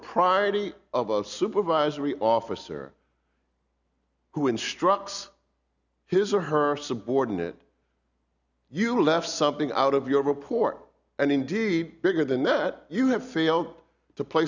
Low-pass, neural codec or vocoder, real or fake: 7.2 kHz; none; real